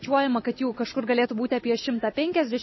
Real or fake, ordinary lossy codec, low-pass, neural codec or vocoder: real; MP3, 24 kbps; 7.2 kHz; none